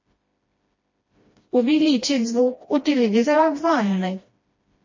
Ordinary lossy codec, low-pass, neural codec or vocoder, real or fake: MP3, 32 kbps; 7.2 kHz; codec, 16 kHz, 1 kbps, FreqCodec, smaller model; fake